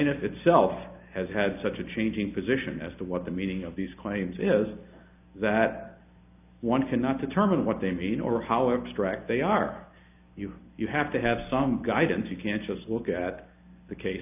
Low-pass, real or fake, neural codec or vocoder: 3.6 kHz; real; none